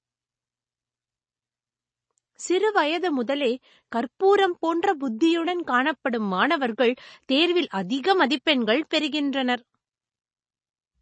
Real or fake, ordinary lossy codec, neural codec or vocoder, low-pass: real; MP3, 32 kbps; none; 9.9 kHz